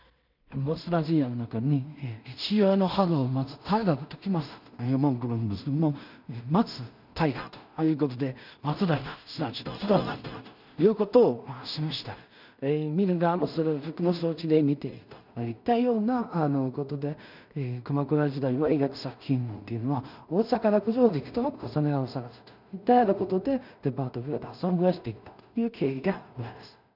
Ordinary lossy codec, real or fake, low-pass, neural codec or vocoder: none; fake; 5.4 kHz; codec, 16 kHz in and 24 kHz out, 0.4 kbps, LongCat-Audio-Codec, two codebook decoder